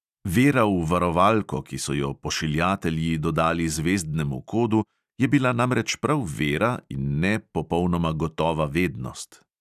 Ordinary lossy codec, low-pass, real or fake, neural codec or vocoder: none; 14.4 kHz; real; none